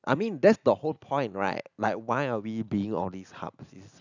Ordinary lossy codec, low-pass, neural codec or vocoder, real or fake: none; 7.2 kHz; none; real